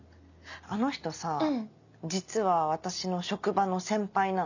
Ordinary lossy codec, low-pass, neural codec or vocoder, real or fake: none; 7.2 kHz; none; real